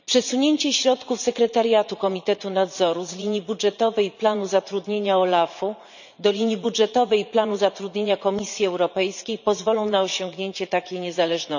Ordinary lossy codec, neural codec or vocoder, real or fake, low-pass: none; vocoder, 44.1 kHz, 80 mel bands, Vocos; fake; 7.2 kHz